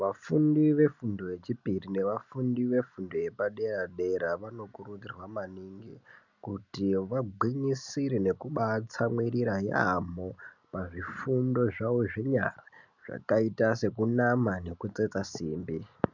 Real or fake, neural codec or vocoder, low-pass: real; none; 7.2 kHz